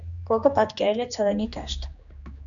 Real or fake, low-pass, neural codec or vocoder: fake; 7.2 kHz; codec, 16 kHz, 2 kbps, X-Codec, HuBERT features, trained on general audio